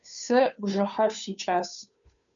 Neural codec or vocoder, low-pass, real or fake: codec, 16 kHz, 2 kbps, FunCodec, trained on Chinese and English, 25 frames a second; 7.2 kHz; fake